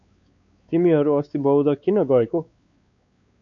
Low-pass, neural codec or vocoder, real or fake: 7.2 kHz; codec, 16 kHz, 4 kbps, X-Codec, WavLM features, trained on Multilingual LibriSpeech; fake